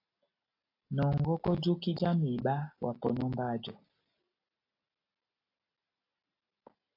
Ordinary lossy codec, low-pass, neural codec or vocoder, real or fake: AAC, 32 kbps; 5.4 kHz; none; real